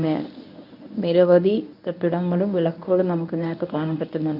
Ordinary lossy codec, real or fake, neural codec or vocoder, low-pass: none; fake; codec, 24 kHz, 0.9 kbps, WavTokenizer, medium speech release version 1; 5.4 kHz